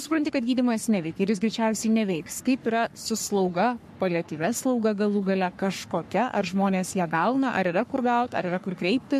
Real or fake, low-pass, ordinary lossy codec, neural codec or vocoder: fake; 14.4 kHz; MP3, 64 kbps; codec, 44.1 kHz, 3.4 kbps, Pupu-Codec